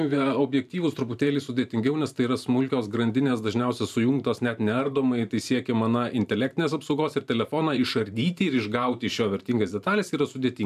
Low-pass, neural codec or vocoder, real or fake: 14.4 kHz; vocoder, 44.1 kHz, 128 mel bands every 512 samples, BigVGAN v2; fake